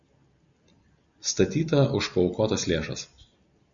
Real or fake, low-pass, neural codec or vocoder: real; 7.2 kHz; none